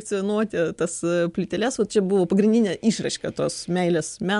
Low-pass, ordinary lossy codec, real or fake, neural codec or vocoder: 10.8 kHz; MP3, 64 kbps; real; none